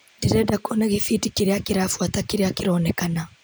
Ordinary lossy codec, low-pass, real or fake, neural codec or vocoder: none; none; real; none